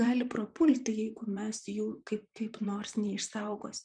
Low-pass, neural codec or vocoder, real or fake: 9.9 kHz; vocoder, 44.1 kHz, 128 mel bands, Pupu-Vocoder; fake